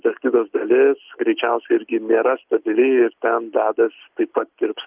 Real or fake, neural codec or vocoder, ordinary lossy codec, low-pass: real; none; Opus, 32 kbps; 3.6 kHz